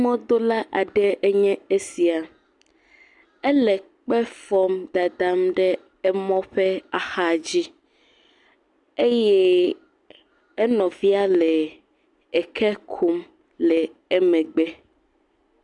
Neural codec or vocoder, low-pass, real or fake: none; 10.8 kHz; real